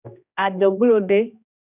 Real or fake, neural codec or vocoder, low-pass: fake; codec, 16 kHz, 1 kbps, X-Codec, HuBERT features, trained on general audio; 3.6 kHz